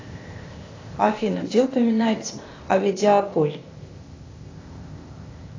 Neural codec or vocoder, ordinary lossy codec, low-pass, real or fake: codec, 16 kHz, 0.8 kbps, ZipCodec; AAC, 32 kbps; 7.2 kHz; fake